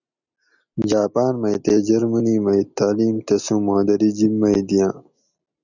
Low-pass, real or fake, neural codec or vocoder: 7.2 kHz; real; none